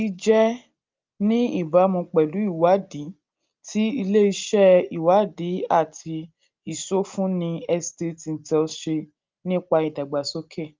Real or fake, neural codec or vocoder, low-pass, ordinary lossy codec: real; none; 7.2 kHz; Opus, 24 kbps